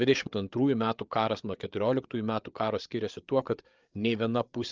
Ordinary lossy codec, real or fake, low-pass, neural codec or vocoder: Opus, 24 kbps; fake; 7.2 kHz; codec, 16 kHz, 8 kbps, FreqCodec, larger model